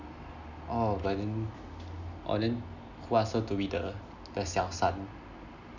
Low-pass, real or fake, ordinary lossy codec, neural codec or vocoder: 7.2 kHz; real; none; none